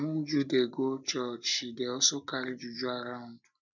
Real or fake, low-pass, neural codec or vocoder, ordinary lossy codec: real; 7.2 kHz; none; none